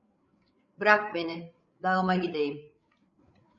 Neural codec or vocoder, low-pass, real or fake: codec, 16 kHz, 8 kbps, FreqCodec, larger model; 7.2 kHz; fake